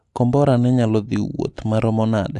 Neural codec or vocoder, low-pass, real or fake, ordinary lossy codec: none; 10.8 kHz; real; AAC, 64 kbps